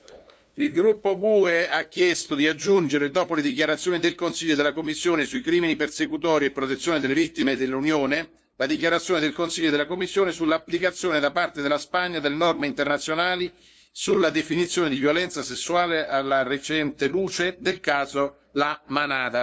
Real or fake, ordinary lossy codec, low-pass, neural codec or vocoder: fake; none; none; codec, 16 kHz, 2 kbps, FunCodec, trained on LibriTTS, 25 frames a second